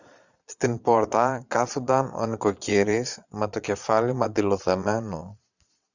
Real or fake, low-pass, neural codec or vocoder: fake; 7.2 kHz; vocoder, 22.05 kHz, 80 mel bands, Vocos